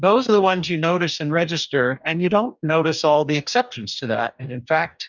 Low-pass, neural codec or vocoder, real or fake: 7.2 kHz; codec, 44.1 kHz, 2.6 kbps, DAC; fake